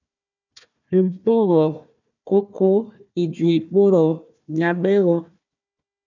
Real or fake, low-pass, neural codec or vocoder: fake; 7.2 kHz; codec, 16 kHz, 1 kbps, FunCodec, trained on Chinese and English, 50 frames a second